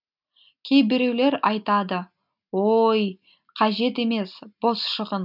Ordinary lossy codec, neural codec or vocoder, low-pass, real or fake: none; none; 5.4 kHz; real